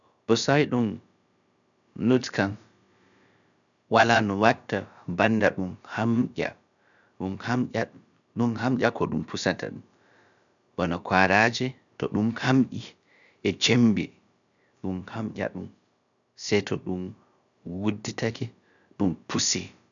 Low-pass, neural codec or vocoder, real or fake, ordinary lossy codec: 7.2 kHz; codec, 16 kHz, about 1 kbps, DyCAST, with the encoder's durations; fake; none